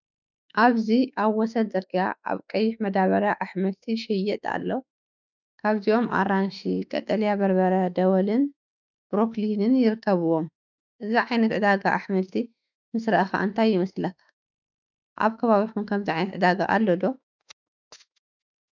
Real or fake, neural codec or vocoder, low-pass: fake; autoencoder, 48 kHz, 32 numbers a frame, DAC-VAE, trained on Japanese speech; 7.2 kHz